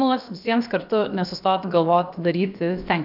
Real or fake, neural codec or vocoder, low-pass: fake; codec, 16 kHz, about 1 kbps, DyCAST, with the encoder's durations; 5.4 kHz